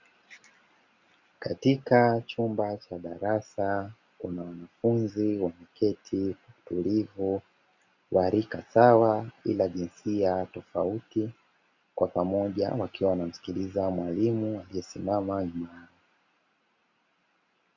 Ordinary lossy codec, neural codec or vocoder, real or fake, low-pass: Opus, 64 kbps; none; real; 7.2 kHz